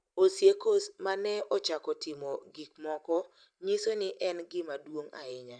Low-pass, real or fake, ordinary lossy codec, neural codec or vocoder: 9.9 kHz; fake; none; vocoder, 44.1 kHz, 128 mel bands every 512 samples, BigVGAN v2